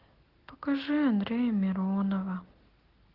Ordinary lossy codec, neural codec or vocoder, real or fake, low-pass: Opus, 16 kbps; none; real; 5.4 kHz